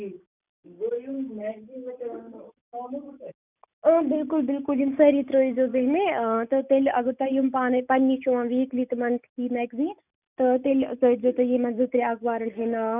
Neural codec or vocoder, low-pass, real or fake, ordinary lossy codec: none; 3.6 kHz; real; none